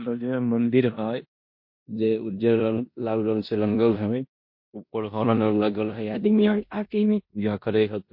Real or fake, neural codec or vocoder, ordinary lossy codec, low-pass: fake; codec, 16 kHz in and 24 kHz out, 0.9 kbps, LongCat-Audio-Codec, four codebook decoder; MP3, 32 kbps; 5.4 kHz